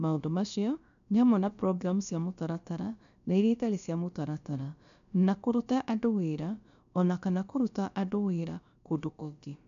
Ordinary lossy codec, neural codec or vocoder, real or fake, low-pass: none; codec, 16 kHz, about 1 kbps, DyCAST, with the encoder's durations; fake; 7.2 kHz